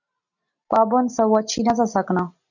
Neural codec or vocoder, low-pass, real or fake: none; 7.2 kHz; real